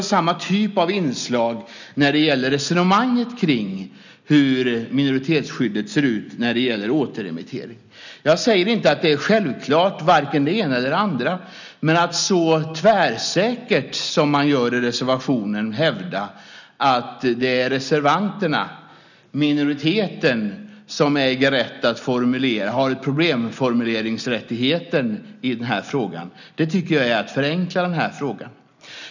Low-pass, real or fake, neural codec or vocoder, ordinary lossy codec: 7.2 kHz; real; none; none